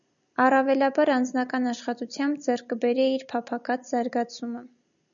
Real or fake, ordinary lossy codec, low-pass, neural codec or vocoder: real; MP3, 96 kbps; 7.2 kHz; none